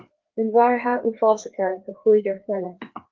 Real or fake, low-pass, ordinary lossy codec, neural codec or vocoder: fake; 7.2 kHz; Opus, 16 kbps; codec, 16 kHz, 2 kbps, FreqCodec, larger model